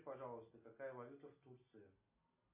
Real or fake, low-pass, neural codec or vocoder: real; 3.6 kHz; none